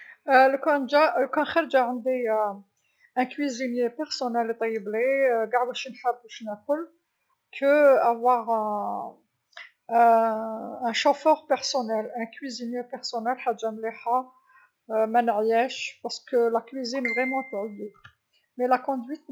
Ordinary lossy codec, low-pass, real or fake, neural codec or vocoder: none; none; real; none